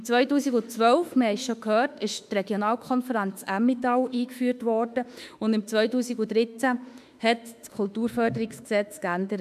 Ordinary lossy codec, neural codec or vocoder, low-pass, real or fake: none; autoencoder, 48 kHz, 32 numbers a frame, DAC-VAE, trained on Japanese speech; 14.4 kHz; fake